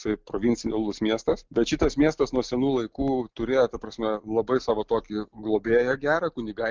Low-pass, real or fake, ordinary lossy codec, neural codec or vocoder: 7.2 kHz; real; Opus, 24 kbps; none